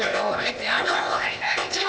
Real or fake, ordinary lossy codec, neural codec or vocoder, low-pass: fake; none; codec, 16 kHz, 0.8 kbps, ZipCodec; none